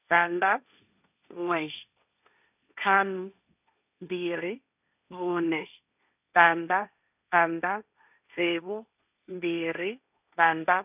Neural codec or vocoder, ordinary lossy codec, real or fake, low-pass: codec, 16 kHz, 1.1 kbps, Voila-Tokenizer; none; fake; 3.6 kHz